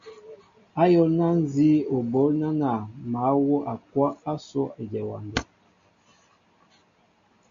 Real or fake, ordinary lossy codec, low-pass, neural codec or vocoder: real; MP3, 64 kbps; 7.2 kHz; none